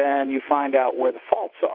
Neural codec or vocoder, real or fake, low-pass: vocoder, 22.05 kHz, 80 mel bands, WaveNeXt; fake; 5.4 kHz